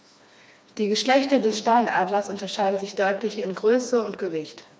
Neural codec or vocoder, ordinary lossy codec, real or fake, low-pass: codec, 16 kHz, 2 kbps, FreqCodec, smaller model; none; fake; none